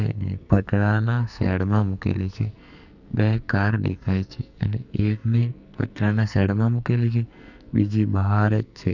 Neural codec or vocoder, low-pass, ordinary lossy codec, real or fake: codec, 44.1 kHz, 2.6 kbps, SNAC; 7.2 kHz; none; fake